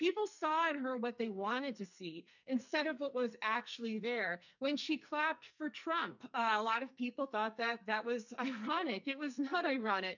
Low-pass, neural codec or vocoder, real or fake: 7.2 kHz; codec, 44.1 kHz, 2.6 kbps, SNAC; fake